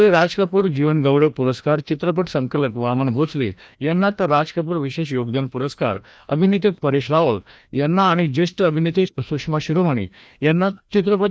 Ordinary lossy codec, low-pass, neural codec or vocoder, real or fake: none; none; codec, 16 kHz, 1 kbps, FreqCodec, larger model; fake